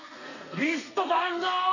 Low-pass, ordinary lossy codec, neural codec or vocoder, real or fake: 7.2 kHz; none; codec, 32 kHz, 1.9 kbps, SNAC; fake